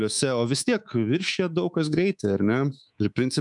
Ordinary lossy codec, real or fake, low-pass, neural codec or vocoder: MP3, 96 kbps; fake; 10.8 kHz; codec, 24 kHz, 3.1 kbps, DualCodec